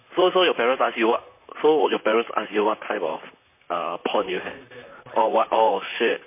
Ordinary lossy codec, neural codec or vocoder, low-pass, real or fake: MP3, 24 kbps; vocoder, 44.1 kHz, 128 mel bands, Pupu-Vocoder; 3.6 kHz; fake